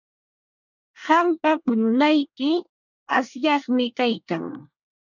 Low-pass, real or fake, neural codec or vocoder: 7.2 kHz; fake; codec, 24 kHz, 1 kbps, SNAC